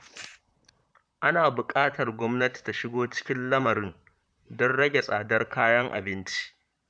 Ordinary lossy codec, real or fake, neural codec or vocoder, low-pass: none; fake; vocoder, 44.1 kHz, 128 mel bands, Pupu-Vocoder; 9.9 kHz